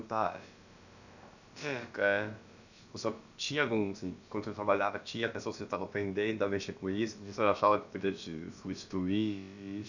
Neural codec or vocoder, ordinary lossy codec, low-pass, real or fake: codec, 16 kHz, about 1 kbps, DyCAST, with the encoder's durations; none; 7.2 kHz; fake